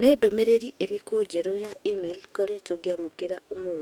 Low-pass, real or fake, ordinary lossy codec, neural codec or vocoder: 19.8 kHz; fake; none; codec, 44.1 kHz, 2.6 kbps, DAC